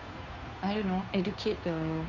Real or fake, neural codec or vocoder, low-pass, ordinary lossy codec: fake; codec, 16 kHz, 2 kbps, FunCodec, trained on Chinese and English, 25 frames a second; 7.2 kHz; none